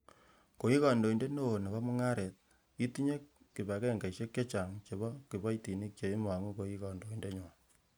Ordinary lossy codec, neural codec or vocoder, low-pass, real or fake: none; none; none; real